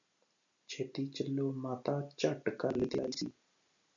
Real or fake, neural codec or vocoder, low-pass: real; none; 7.2 kHz